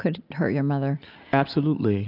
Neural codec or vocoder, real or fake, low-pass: none; real; 5.4 kHz